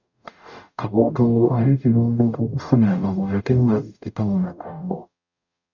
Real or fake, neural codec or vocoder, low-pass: fake; codec, 44.1 kHz, 0.9 kbps, DAC; 7.2 kHz